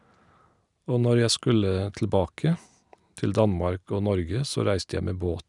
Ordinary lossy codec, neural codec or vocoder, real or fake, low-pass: none; none; real; 10.8 kHz